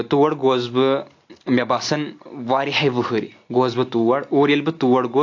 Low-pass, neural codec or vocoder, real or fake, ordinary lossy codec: 7.2 kHz; none; real; AAC, 48 kbps